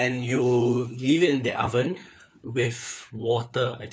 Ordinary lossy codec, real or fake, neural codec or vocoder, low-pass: none; fake; codec, 16 kHz, 4 kbps, FunCodec, trained on LibriTTS, 50 frames a second; none